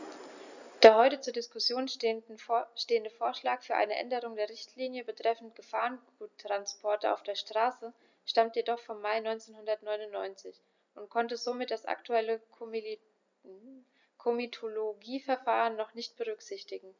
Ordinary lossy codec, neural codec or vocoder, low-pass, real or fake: none; none; 7.2 kHz; real